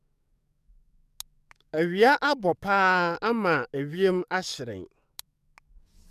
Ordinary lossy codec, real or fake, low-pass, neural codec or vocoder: none; fake; 14.4 kHz; codec, 44.1 kHz, 7.8 kbps, DAC